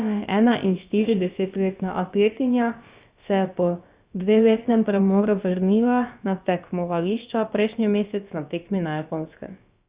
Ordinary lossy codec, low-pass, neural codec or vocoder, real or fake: Opus, 64 kbps; 3.6 kHz; codec, 16 kHz, about 1 kbps, DyCAST, with the encoder's durations; fake